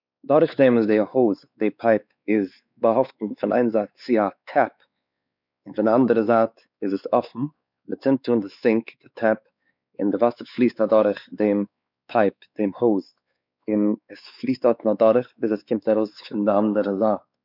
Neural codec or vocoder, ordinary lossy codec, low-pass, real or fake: codec, 16 kHz, 4 kbps, X-Codec, WavLM features, trained on Multilingual LibriSpeech; none; 5.4 kHz; fake